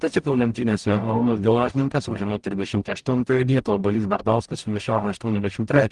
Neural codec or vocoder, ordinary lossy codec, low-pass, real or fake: codec, 44.1 kHz, 0.9 kbps, DAC; Opus, 24 kbps; 10.8 kHz; fake